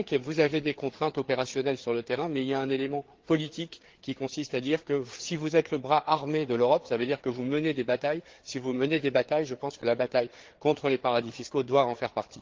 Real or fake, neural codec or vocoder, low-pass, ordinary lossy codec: fake; codec, 16 kHz, 4 kbps, FreqCodec, larger model; 7.2 kHz; Opus, 16 kbps